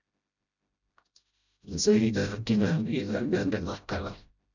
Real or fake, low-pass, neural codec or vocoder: fake; 7.2 kHz; codec, 16 kHz, 0.5 kbps, FreqCodec, smaller model